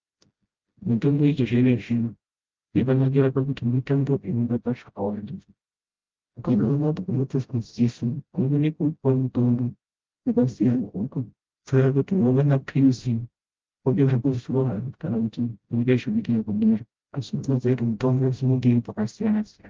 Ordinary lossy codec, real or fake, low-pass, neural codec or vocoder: Opus, 24 kbps; fake; 7.2 kHz; codec, 16 kHz, 0.5 kbps, FreqCodec, smaller model